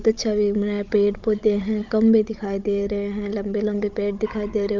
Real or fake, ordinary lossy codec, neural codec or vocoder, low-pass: fake; Opus, 24 kbps; codec, 16 kHz, 16 kbps, FunCodec, trained on Chinese and English, 50 frames a second; 7.2 kHz